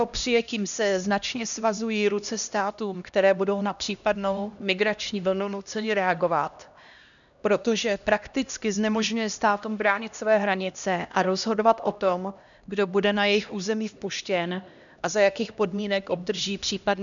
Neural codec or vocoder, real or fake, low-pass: codec, 16 kHz, 1 kbps, X-Codec, HuBERT features, trained on LibriSpeech; fake; 7.2 kHz